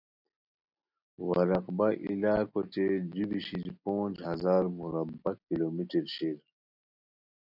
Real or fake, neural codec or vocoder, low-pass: real; none; 5.4 kHz